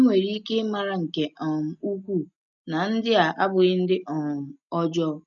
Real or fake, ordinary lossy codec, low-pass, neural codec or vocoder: real; none; 7.2 kHz; none